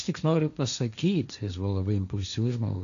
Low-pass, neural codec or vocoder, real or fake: 7.2 kHz; codec, 16 kHz, 1.1 kbps, Voila-Tokenizer; fake